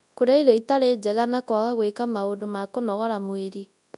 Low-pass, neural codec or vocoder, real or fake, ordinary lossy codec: 10.8 kHz; codec, 24 kHz, 0.9 kbps, WavTokenizer, large speech release; fake; none